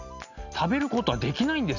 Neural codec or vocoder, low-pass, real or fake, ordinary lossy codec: none; 7.2 kHz; real; none